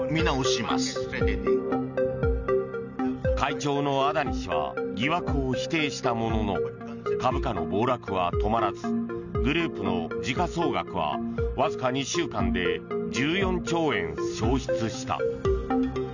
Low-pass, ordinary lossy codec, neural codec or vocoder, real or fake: 7.2 kHz; none; none; real